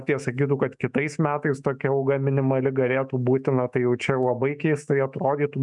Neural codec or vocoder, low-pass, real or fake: autoencoder, 48 kHz, 128 numbers a frame, DAC-VAE, trained on Japanese speech; 10.8 kHz; fake